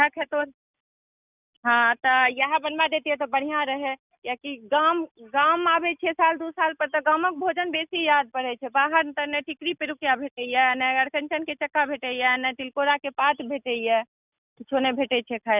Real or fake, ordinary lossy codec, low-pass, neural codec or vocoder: real; none; 3.6 kHz; none